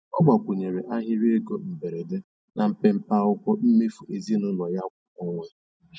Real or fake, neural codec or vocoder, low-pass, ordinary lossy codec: real; none; none; none